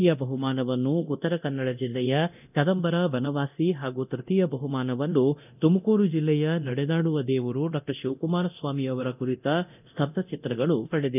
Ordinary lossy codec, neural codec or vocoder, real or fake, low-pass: none; codec, 24 kHz, 0.9 kbps, DualCodec; fake; 3.6 kHz